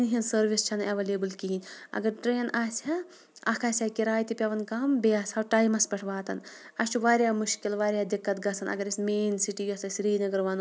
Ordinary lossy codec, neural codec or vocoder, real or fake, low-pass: none; none; real; none